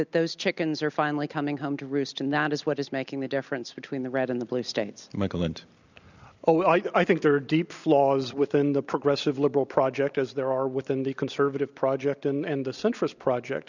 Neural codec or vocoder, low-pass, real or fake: none; 7.2 kHz; real